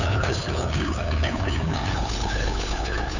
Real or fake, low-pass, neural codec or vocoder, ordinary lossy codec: fake; 7.2 kHz; codec, 16 kHz, 2 kbps, FunCodec, trained on LibriTTS, 25 frames a second; none